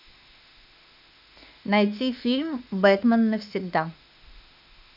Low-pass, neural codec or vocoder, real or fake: 5.4 kHz; autoencoder, 48 kHz, 32 numbers a frame, DAC-VAE, trained on Japanese speech; fake